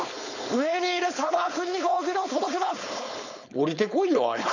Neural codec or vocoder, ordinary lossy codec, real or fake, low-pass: codec, 16 kHz, 4.8 kbps, FACodec; none; fake; 7.2 kHz